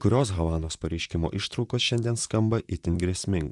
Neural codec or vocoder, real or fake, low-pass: vocoder, 44.1 kHz, 128 mel bands, Pupu-Vocoder; fake; 10.8 kHz